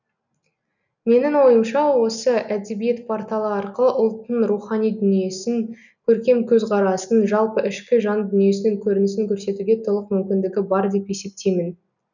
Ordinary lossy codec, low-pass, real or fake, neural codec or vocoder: none; 7.2 kHz; real; none